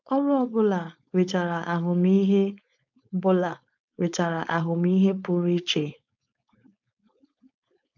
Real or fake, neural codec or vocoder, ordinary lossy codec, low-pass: fake; codec, 16 kHz, 4.8 kbps, FACodec; none; 7.2 kHz